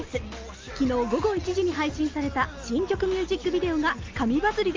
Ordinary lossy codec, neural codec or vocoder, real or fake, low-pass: Opus, 32 kbps; none; real; 7.2 kHz